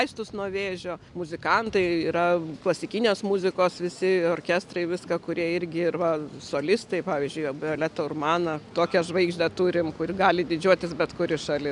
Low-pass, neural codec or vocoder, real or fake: 10.8 kHz; none; real